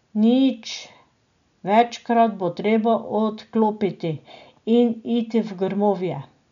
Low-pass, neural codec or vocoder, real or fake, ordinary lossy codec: 7.2 kHz; none; real; none